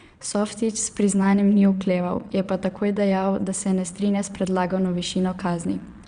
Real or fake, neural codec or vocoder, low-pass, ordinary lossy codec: fake; vocoder, 22.05 kHz, 80 mel bands, Vocos; 9.9 kHz; Opus, 64 kbps